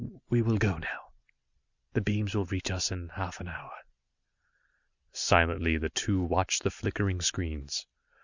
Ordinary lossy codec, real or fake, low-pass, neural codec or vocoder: Opus, 64 kbps; real; 7.2 kHz; none